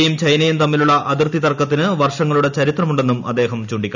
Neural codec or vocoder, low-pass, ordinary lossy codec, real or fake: none; 7.2 kHz; none; real